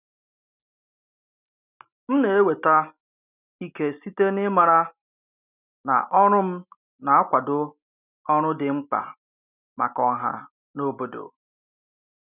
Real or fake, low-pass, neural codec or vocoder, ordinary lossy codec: real; 3.6 kHz; none; none